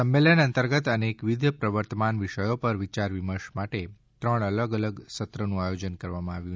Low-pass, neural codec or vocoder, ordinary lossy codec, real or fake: none; none; none; real